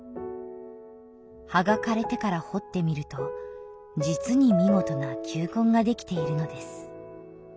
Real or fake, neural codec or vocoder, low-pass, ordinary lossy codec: real; none; none; none